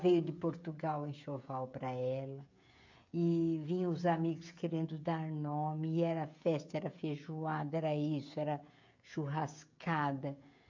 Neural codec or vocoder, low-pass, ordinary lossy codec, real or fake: codec, 16 kHz, 16 kbps, FreqCodec, smaller model; 7.2 kHz; MP3, 64 kbps; fake